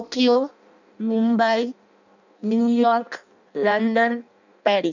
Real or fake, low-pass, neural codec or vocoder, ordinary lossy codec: fake; 7.2 kHz; codec, 16 kHz in and 24 kHz out, 0.6 kbps, FireRedTTS-2 codec; none